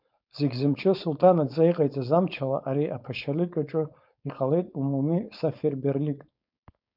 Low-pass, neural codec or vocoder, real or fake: 5.4 kHz; codec, 16 kHz, 4.8 kbps, FACodec; fake